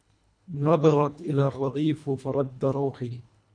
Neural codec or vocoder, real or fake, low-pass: codec, 24 kHz, 1.5 kbps, HILCodec; fake; 9.9 kHz